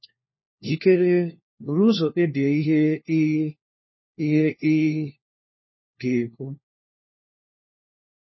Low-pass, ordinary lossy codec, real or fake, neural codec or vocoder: 7.2 kHz; MP3, 24 kbps; fake; codec, 16 kHz, 1 kbps, FunCodec, trained on LibriTTS, 50 frames a second